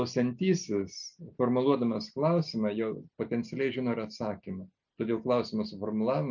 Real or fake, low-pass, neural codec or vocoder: real; 7.2 kHz; none